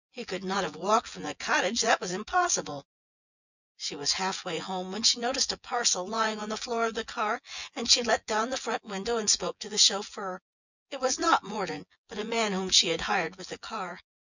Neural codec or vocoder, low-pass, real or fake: vocoder, 24 kHz, 100 mel bands, Vocos; 7.2 kHz; fake